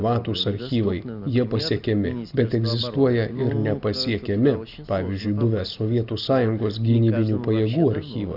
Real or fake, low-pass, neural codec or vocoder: real; 5.4 kHz; none